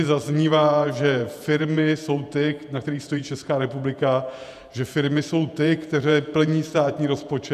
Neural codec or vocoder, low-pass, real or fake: vocoder, 48 kHz, 128 mel bands, Vocos; 14.4 kHz; fake